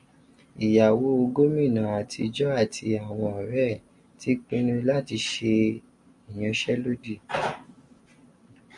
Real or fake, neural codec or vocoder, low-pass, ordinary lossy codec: real; none; 10.8 kHz; MP3, 96 kbps